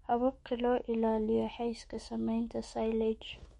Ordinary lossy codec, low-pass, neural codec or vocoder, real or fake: MP3, 48 kbps; 19.8 kHz; autoencoder, 48 kHz, 128 numbers a frame, DAC-VAE, trained on Japanese speech; fake